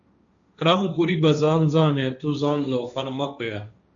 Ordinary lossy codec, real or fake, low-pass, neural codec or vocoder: MP3, 96 kbps; fake; 7.2 kHz; codec, 16 kHz, 1.1 kbps, Voila-Tokenizer